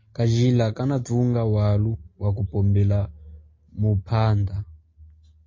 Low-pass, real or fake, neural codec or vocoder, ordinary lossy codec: 7.2 kHz; real; none; MP3, 32 kbps